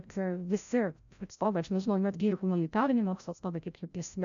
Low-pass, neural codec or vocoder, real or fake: 7.2 kHz; codec, 16 kHz, 0.5 kbps, FreqCodec, larger model; fake